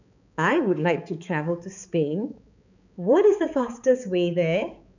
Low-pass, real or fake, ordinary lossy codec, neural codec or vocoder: 7.2 kHz; fake; none; codec, 16 kHz, 4 kbps, X-Codec, HuBERT features, trained on balanced general audio